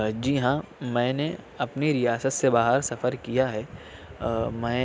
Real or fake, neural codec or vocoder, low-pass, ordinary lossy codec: real; none; none; none